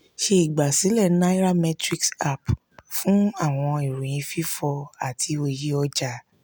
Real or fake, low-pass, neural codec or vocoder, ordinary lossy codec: real; none; none; none